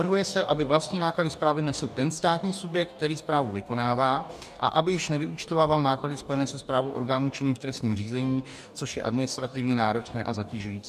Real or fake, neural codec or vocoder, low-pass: fake; codec, 44.1 kHz, 2.6 kbps, DAC; 14.4 kHz